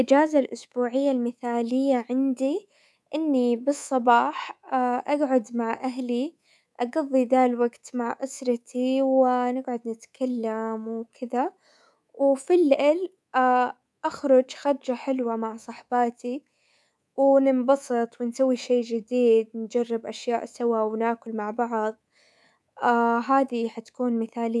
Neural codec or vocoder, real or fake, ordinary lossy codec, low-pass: codec, 24 kHz, 3.1 kbps, DualCodec; fake; none; none